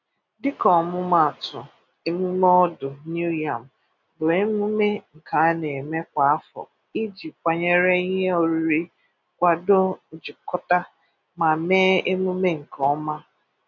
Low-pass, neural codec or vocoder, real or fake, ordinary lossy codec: 7.2 kHz; none; real; none